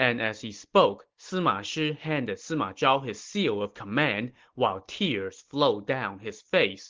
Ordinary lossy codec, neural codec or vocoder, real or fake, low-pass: Opus, 16 kbps; none; real; 7.2 kHz